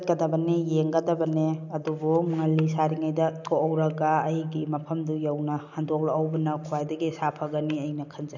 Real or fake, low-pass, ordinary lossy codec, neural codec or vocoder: real; 7.2 kHz; none; none